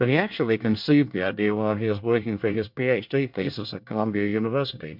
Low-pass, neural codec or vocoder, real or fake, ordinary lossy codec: 5.4 kHz; codec, 24 kHz, 1 kbps, SNAC; fake; MP3, 48 kbps